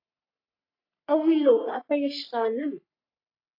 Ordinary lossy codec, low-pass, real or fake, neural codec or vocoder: AAC, 48 kbps; 5.4 kHz; fake; codec, 44.1 kHz, 3.4 kbps, Pupu-Codec